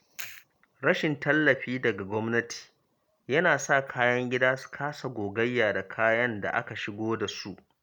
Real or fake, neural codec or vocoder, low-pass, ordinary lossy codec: real; none; 19.8 kHz; none